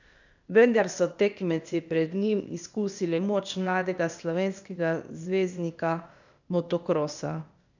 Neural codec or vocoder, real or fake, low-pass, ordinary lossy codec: codec, 16 kHz, 0.8 kbps, ZipCodec; fake; 7.2 kHz; none